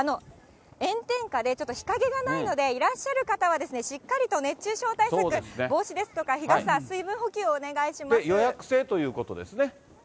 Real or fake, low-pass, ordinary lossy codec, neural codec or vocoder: real; none; none; none